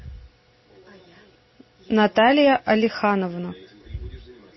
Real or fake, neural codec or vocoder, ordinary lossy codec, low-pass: real; none; MP3, 24 kbps; 7.2 kHz